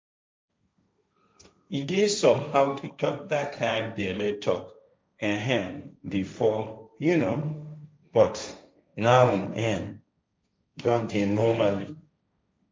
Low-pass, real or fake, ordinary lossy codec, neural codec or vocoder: none; fake; none; codec, 16 kHz, 1.1 kbps, Voila-Tokenizer